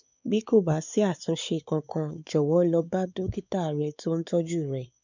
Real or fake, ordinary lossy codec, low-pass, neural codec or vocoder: fake; none; 7.2 kHz; codec, 16 kHz, 4 kbps, X-Codec, WavLM features, trained on Multilingual LibriSpeech